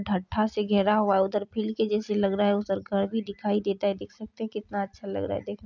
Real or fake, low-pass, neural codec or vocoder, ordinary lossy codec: real; 7.2 kHz; none; none